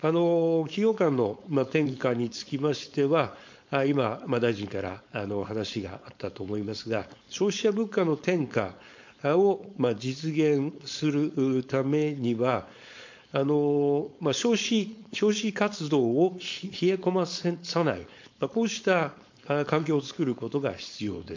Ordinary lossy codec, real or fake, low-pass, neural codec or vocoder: MP3, 48 kbps; fake; 7.2 kHz; codec, 16 kHz, 4.8 kbps, FACodec